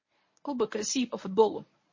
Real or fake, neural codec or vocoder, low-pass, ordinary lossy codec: fake; codec, 24 kHz, 0.9 kbps, WavTokenizer, medium speech release version 1; 7.2 kHz; MP3, 32 kbps